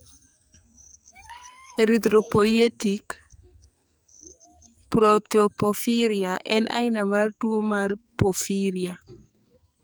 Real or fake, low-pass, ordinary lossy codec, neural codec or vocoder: fake; none; none; codec, 44.1 kHz, 2.6 kbps, SNAC